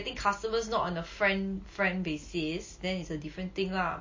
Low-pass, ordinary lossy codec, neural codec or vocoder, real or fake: 7.2 kHz; MP3, 32 kbps; none; real